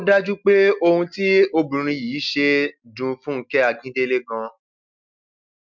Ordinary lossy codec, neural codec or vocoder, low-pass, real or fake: MP3, 64 kbps; none; 7.2 kHz; real